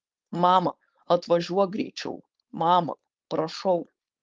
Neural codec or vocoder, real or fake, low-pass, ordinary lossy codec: codec, 16 kHz, 4.8 kbps, FACodec; fake; 7.2 kHz; Opus, 32 kbps